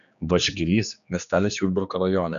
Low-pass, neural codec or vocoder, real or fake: 7.2 kHz; codec, 16 kHz, 2 kbps, X-Codec, HuBERT features, trained on general audio; fake